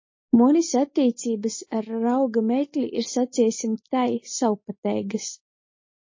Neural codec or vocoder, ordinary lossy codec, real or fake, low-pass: none; MP3, 32 kbps; real; 7.2 kHz